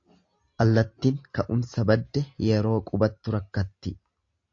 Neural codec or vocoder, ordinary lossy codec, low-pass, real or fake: none; AAC, 48 kbps; 7.2 kHz; real